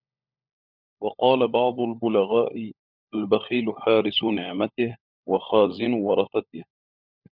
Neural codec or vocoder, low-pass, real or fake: codec, 16 kHz, 16 kbps, FunCodec, trained on LibriTTS, 50 frames a second; 5.4 kHz; fake